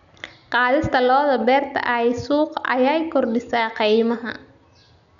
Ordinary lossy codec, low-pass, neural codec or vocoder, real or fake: none; 7.2 kHz; none; real